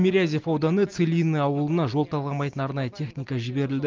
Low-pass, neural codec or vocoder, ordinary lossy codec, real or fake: 7.2 kHz; none; Opus, 24 kbps; real